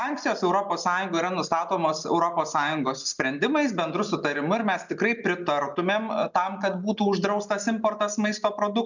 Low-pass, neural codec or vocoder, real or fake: 7.2 kHz; none; real